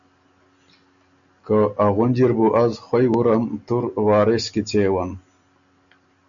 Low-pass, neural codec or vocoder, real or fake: 7.2 kHz; none; real